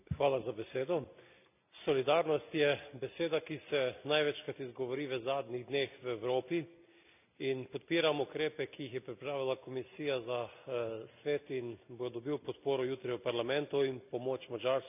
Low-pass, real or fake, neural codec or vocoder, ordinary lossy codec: 3.6 kHz; real; none; none